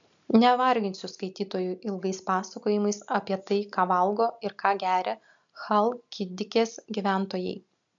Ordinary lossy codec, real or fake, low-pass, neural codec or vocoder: AAC, 64 kbps; real; 7.2 kHz; none